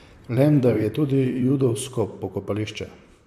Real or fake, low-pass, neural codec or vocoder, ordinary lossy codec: fake; 14.4 kHz; vocoder, 44.1 kHz, 128 mel bands, Pupu-Vocoder; MP3, 96 kbps